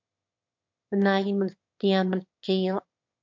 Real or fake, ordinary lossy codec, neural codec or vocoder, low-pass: fake; MP3, 48 kbps; autoencoder, 22.05 kHz, a latent of 192 numbers a frame, VITS, trained on one speaker; 7.2 kHz